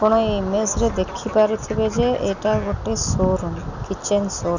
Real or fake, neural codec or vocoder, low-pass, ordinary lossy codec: real; none; 7.2 kHz; none